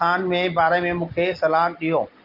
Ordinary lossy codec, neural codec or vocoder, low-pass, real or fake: Opus, 24 kbps; none; 5.4 kHz; real